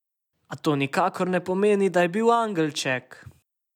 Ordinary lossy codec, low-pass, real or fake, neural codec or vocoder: none; 19.8 kHz; real; none